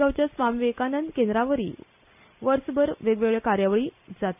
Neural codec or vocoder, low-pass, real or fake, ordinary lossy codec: none; 3.6 kHz; real; none